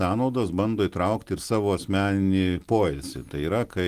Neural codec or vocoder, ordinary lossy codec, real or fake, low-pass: none; Opus, 24 kbps; real; 14.4 kHz